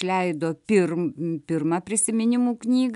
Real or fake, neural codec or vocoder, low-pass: real; none; 10.8 kHz